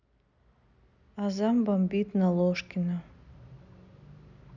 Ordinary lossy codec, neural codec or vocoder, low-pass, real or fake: none; none; 7.2 kHz; real